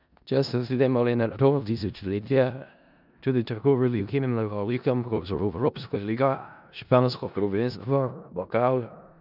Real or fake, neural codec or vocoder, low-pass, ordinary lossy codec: fake; codec, 16 kHz in and 24 kHz out, 0.4 kbps, LongCat-Audio-Codec, four codebook decoder; 5.4 kHz; none